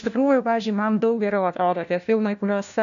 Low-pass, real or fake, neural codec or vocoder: 7.2 kHz; fake; codec, 16 kHz, 1 kbps, FunCodec, trained on LibriTTS, 50 frames a second